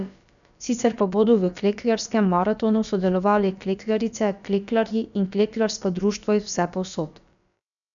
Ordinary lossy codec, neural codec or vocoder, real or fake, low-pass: none; codec, 16 kHz, about 1 kbps, DyCAST, with the encoder's durations; fake; 7.2 kHz